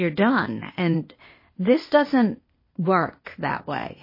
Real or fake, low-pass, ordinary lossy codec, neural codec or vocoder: fake; 5.4 kHz; MP3, 24 kbps; codec, 16 kHz, 0.8 kbps, ZipCodec